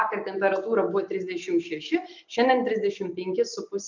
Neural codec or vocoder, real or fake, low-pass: none; real; 7.2 kHz